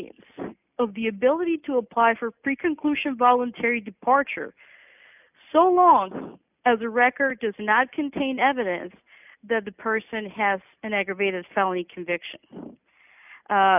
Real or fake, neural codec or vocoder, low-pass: real; none; 3.6 kHz